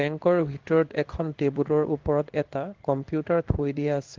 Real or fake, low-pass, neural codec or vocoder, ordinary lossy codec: fake; 7.2 kHz; codec, 16 kHz in and 24 kHz out, 1 kbps, XY-Tokenizer; Opus, 16 kbps